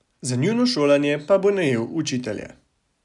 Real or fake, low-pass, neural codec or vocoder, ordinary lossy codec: real; 10.8 kHz; none; none